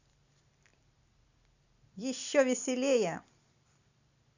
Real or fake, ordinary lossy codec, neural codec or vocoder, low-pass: real; none; none; 7.2 kHz